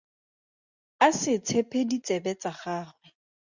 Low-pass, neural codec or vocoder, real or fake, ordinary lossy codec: 7.2 kHz; none; real; Opus, 64 kbps